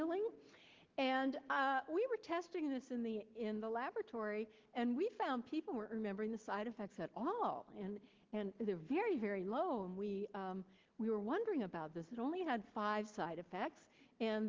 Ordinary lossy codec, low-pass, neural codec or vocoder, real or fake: Opus, 16 kbps; 7.2 kHz; none; real